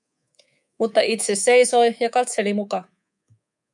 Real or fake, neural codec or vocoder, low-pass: fake; codec, 24 kHz, 3.1 kbps, DualCodec; 10.8 kHz